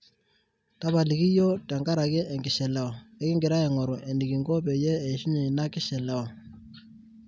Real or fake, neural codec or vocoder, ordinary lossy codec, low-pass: real; none; none; none